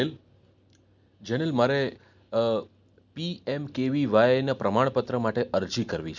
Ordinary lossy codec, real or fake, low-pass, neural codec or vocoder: none; real; 7.2 kHz; none